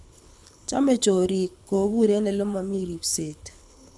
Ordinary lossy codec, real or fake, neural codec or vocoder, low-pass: none; fake; codec, 24 kHz, 6 kbps, HILCodec; none